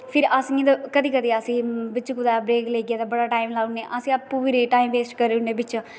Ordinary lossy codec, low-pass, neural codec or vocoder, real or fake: none; none; none; real